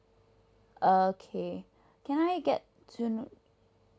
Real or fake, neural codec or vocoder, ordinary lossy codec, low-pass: real; none; none; none